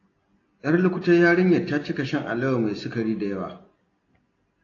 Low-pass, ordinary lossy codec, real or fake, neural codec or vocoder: 7.2 kHz; AAC, 32 kbps; real; none